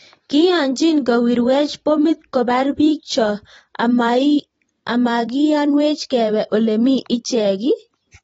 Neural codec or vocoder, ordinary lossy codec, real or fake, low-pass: vocoder, 44.1 kHz, 128 mel bands every 512 samples, BigVGAN v2; AAC, 24 kbps; fake; 19.8 kHz